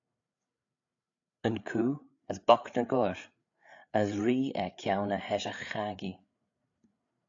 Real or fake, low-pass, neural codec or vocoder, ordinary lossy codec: fake; 7.2 kHz; codec, 16 kHz, 8 kbps, FreqCodec, larger model; AAC, 48 kbps